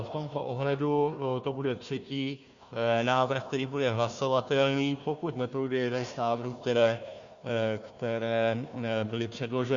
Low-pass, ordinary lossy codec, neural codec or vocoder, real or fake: 7.2 kHz; Opus, 64 kbps; codec, 16 kHz, 1 kbps, FunCodec, trained on Chinese and English, 50 frames a second; fake